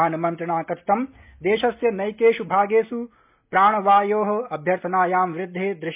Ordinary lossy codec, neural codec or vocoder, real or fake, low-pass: MP3, 32 kbps; none; real; 3.6 kHz